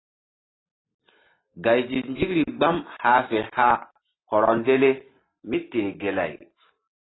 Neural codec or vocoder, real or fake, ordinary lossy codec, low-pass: none; real; AAC, 16 kbps; 7.2 kHz